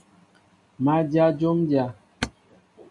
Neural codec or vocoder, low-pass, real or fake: none; 10.8 kHz; real